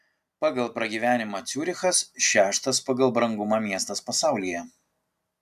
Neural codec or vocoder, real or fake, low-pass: none; real; 14.4 kHz